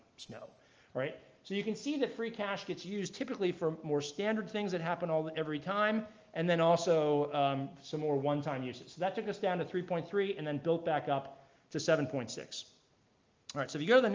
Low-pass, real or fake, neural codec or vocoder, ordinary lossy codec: 7.2 kHz; real; none; Opus, 24 kbps